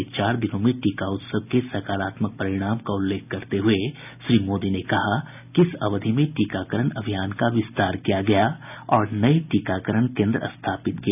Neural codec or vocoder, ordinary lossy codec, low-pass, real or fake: none; none; 3.6 kHz; real